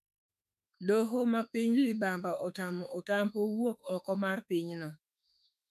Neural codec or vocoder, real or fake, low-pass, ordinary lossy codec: autoencoder, 48 kHz, 32 numbers a frame, DAC-VAE, trained on Japanese speech; fake; 14.4 kHz; none